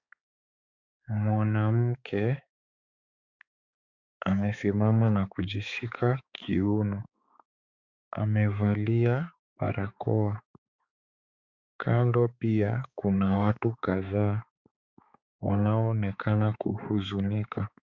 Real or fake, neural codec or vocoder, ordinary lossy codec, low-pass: fake; codec, 16 kHz, 4 kbps, X-Codec, HuBERT features, trained on balanced general audio; Opus, 64 kbps; 7.2 kHz